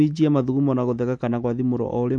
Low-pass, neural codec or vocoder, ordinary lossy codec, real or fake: 9.9 kHz; none; MP3, 64 kbps; real